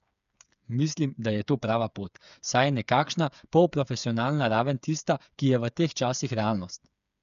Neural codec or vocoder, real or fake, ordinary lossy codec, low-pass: codec, 16 kHz, 8 kbps, FreqCodec, smaller model; fake; none; 7.2 kHz